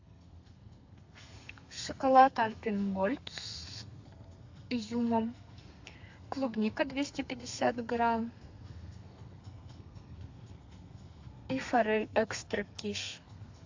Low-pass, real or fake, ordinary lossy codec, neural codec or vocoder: 7.2 kHz; fake; AAC, 48 kbps; codec, 32 kHz, 1.9 kbps, SNAC